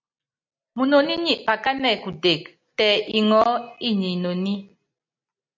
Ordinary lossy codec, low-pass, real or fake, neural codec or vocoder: MP3, 64 kbps; 7.2 kHz; real; none